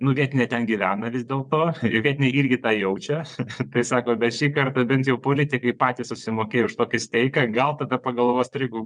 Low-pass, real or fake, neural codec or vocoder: 9.9 kHz; fake; vocoder, 22.05 kHz, 80 mel bands, WaveNeXt